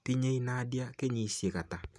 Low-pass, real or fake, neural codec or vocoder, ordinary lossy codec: none; real; none; none